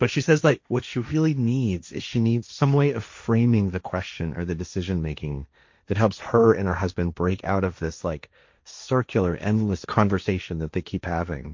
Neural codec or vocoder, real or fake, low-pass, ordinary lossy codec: codec, 16 kHz, 1.1 kbps, Voila-Tokenizer; fake; 7.2 kHz; MP3, 48 kbps